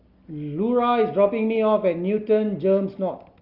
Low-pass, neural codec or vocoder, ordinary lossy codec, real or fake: 5.4 kHz; none; Opus, 32 kbps; real